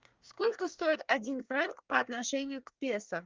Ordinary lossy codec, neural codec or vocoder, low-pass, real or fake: Opus, 32 kbps; codec, 24 kHz, 1 kbps, SNAC; 7.2 kHz; fake